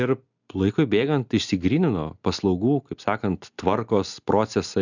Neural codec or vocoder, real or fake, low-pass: none; real; 7.2 kHz